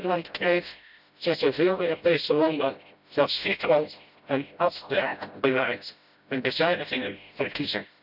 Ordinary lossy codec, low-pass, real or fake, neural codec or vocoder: none; 5.4 kHz; fake; codec, 16 kHz, 0.5 kbps, FreqCodec, smaller model